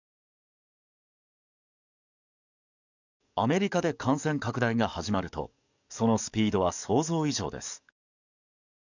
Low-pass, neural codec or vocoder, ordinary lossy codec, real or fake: 7.2 kHz; codec, 44.1 kHz, 7.8 kbps, DAC; none; fake